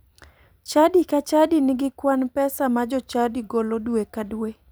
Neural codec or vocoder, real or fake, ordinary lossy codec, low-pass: vocoder, 44.1 kHz, 128 mel bands every 256 samples, BigVGAN v2; fake; none; none